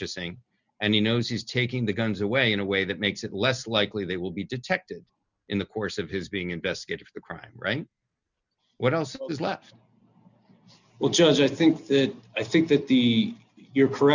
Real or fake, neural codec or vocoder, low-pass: real; none; 7.2 kHz